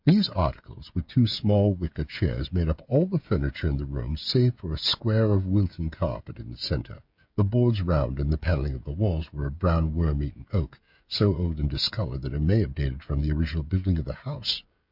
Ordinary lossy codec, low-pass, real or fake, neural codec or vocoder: MP3, 48 kbps; 5.4 kHz; fake; codec, 16 kHz, 16 kbps, FreqCodec, smaller model